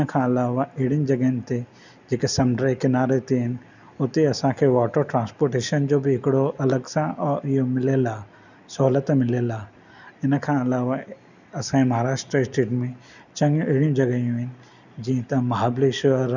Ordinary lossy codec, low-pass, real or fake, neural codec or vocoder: none; 7.2 kHz; real; none